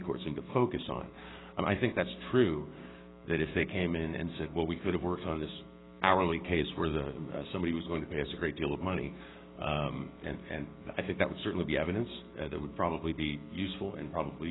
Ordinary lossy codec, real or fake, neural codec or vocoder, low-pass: AAC, 16 kbps; fake; codec, 16 kHz, 6 kbps, DAC; 7.2 kHz